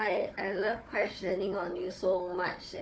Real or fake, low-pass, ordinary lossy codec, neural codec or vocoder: fake; none; none; codec, 16 kHz, 4 kbps, FunCodec, trained on Chinese and English, 50 frames a second